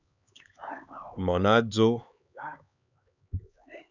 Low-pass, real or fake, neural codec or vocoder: 7.2 kHz; fake; codec, 16 kHz, 4 kbps, X-Codec, HuBERT features, trained on LibriSpeech